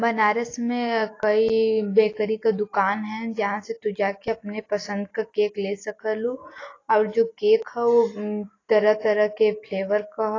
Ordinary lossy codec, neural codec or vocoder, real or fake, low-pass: AAC, 32 kbps; none; real; 7.2 kHz